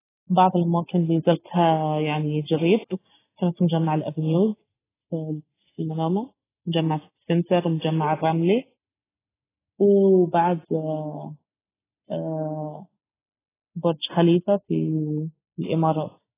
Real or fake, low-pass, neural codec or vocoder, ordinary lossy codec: real; 3.6 kHz; none; AAC, 16 kbps